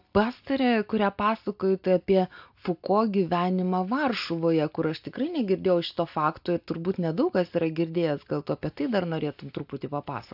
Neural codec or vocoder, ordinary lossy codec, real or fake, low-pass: none; AAC, 48 kbps; real; 5.4 kHz